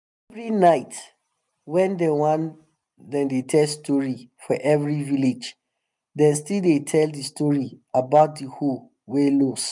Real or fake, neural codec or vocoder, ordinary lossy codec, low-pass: real; none; none; 10.8 kHz